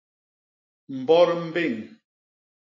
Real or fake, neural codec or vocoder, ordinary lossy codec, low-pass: real; none; AAC, 48 kbps; 7.2 kHz